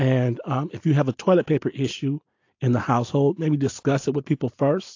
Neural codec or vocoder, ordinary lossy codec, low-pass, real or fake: none; AAC, 48 kbps; 7.2 kHz; real